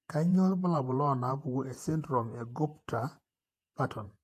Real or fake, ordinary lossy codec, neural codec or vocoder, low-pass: fake; AAC, 64 kbps; vocoder, 48 kHz, 128 mel bands, Vocos; 14.4 kHz